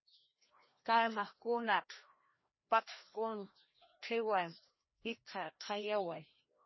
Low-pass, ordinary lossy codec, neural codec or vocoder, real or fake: 7.2 kHz; MP3, 24 kbps; codec, 16 kHz, 1 kbps, FreqCodec, larger model; fake